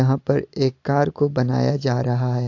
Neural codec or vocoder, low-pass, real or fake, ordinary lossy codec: none; 7.2 kHz; real; AAC, 48 kbps